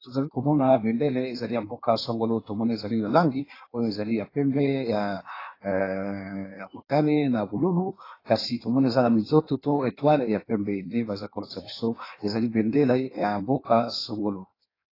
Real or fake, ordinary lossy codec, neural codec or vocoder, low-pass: fake; AAC, 24 kbps; codec, 16 kHz in and 24 kHz out, 1.1 kbps, FireRedTTS-2 codec; 5.4 kHz